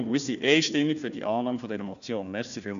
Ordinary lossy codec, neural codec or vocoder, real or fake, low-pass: none; codec, 16 kHz, 1 kbps, FunCodec, trained on Chinese and English, 50 frames a second; fake; 7.2 kHz